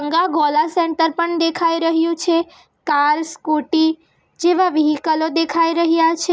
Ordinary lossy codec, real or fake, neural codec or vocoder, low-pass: none; real; none; none